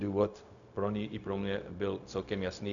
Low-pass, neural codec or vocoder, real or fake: 7.2 kHz; codec, 16 kHz, 0.4 kbps, LongCat-Audio-Codec; fake